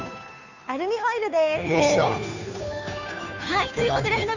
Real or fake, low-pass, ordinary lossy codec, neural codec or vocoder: fake; 7.2 kHz; none; codec, 16 kHz, 2 kbps, FunCodec, trained on Chinese and English, 25 frames a second